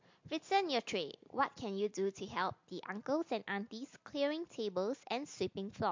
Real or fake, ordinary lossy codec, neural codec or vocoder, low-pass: real; MP3, 48 kbps; none; 7.2 kHz